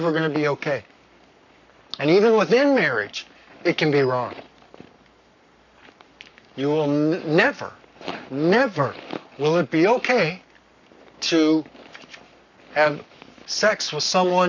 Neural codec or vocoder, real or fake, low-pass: codec, 44.1 kHz, 7.8 kbps, Pupu-Codec; fake; 7.2 kHz